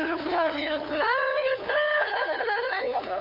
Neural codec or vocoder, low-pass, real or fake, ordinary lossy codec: codec, 16 kHz, 2 kbps, FunCodec, trained on LibriTTS, 25 frames a second; 5.4 kHz; fake; none